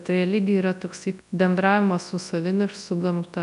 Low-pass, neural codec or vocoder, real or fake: 10.8 kHz; codec, 24 kHz, 0.9 kbps, WavTokenizer, large speech release; fake